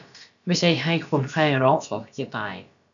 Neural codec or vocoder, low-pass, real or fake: codec, 16 kHz, about 1 kbps, DyCAST, with the encoder's durations; 7.2 kHz; fake